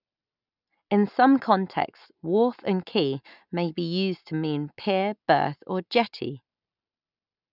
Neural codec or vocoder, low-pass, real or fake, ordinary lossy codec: none; 5.4 kHz; real; none